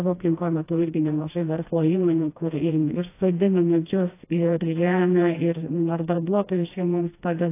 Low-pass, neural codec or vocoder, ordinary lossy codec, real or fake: 3.6 kHz; codec, 16 kHz, 1 kbps, FreqCodec, smaller model; AAC, 24 kbps; fake